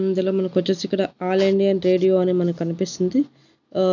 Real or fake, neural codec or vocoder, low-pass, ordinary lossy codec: real; none; 7.2 kHz; none